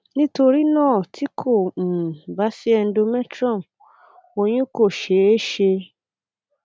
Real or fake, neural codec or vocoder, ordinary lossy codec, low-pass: real; none; none; none